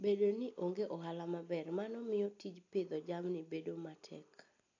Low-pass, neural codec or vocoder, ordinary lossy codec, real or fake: 7.2 kHz; none; AAC, 48 kbps; real